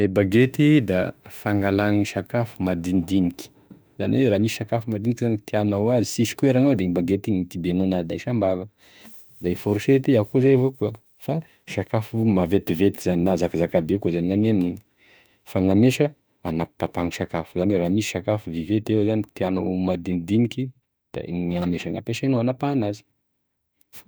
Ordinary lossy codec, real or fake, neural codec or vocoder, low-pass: none; fake; autoencoder, 48 kHz, 32 numbers a frame, DAC-VAE, trained on Japanese speech; none